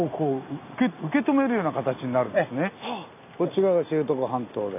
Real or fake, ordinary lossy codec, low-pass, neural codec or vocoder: real; none; 3.6 kHz; none